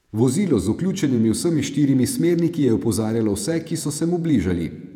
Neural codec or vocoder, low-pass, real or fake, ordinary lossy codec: vocoder, 48 kHz, 128 mel bands, Vocos; 19.8 kHz; fake; none